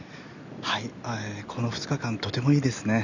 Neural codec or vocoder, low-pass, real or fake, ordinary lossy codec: none; 7.2 kHz; real; none